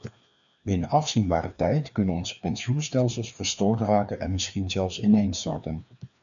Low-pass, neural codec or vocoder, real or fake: 7.2 kHz; codec, 16 kHz, 2 kbps, FreqCodec, larger model; fake